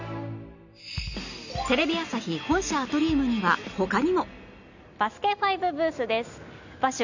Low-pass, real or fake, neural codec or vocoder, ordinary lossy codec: 7.2 kHz; real; none; none